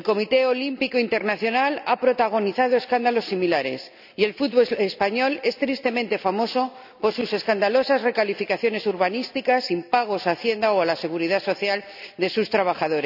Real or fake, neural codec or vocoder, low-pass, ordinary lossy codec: real; none; 5.4 kHz; none